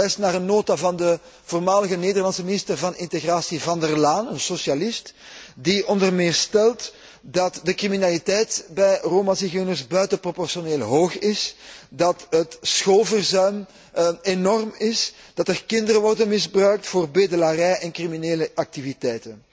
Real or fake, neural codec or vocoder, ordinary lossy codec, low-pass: real; none; none; none